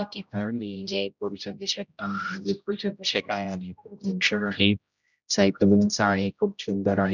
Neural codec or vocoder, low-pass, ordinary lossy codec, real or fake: codec, 16 kHz, 0.5 kbps, X-Codec, HuBERT features, trained on general audio; 7.2 kHz; none; fake